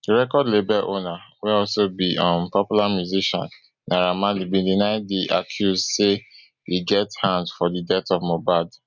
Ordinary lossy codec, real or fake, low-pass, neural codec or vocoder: none; real; 7.2 kHz; none